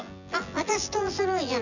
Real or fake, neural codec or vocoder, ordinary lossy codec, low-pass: fake; vocoder, 24 kHz, 100 mel bands, Vocos; none; 7.2 kHz